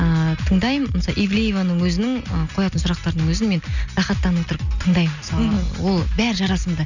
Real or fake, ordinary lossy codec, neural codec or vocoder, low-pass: real; none; none; 7.2 kHz